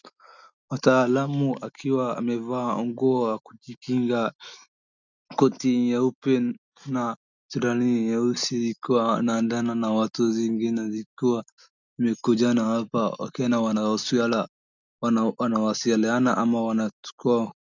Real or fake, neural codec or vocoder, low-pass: real; none; 7.2 kHz